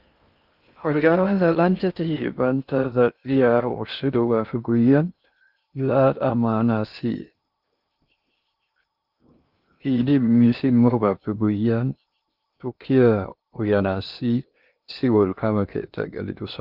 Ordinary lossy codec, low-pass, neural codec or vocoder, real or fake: Opus, 32 kbps; 5.4 kHz; codec, 16 kHz in and 24 kHz out, 0.6 kbps, FocalCodec, streaming, 2048 codes; fake